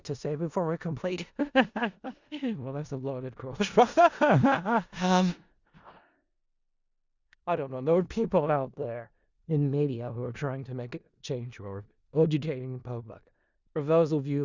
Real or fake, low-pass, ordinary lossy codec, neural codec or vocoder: fake; 7.2 kHz; Opus, 64 kbps; codec, 16 kHz in and 24 kHz out, 0.4 kbps, LongCat-Audio-Codec, four codebook decoder